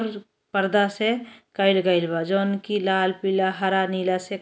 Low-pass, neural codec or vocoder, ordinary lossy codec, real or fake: none; none; none; real